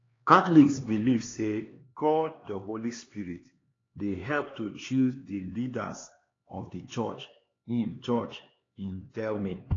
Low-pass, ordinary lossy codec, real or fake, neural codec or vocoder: 7.2 kHz; AAC, 32 kbps; fake; codec, 16 kHz, 2 kbps, X-Codec, HuBERT features, trained on LibriSpeech